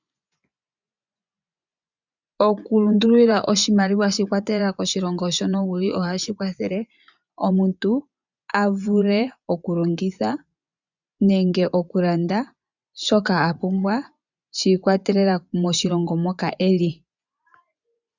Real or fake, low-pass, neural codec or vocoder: fake; 7.2 kHz; vocoder, 24 kHz, 100 mel bands, Vocos